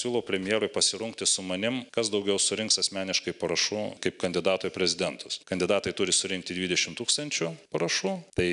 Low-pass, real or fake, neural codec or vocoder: 10.8 kHz; real; none